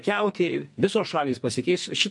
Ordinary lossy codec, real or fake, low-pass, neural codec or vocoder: MP3, 64 kbps; fake; 10.8 kHz; codec, 44.1 kHz, 2.6 kbps, SNAC